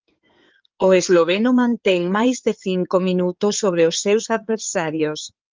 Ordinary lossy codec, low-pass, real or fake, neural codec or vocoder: Opus, 32 kbps; 7.2 kHz; fake; codec, 16 kHz in and 24 kHz out, 2.2 kbps, FireRedTTS-2 codec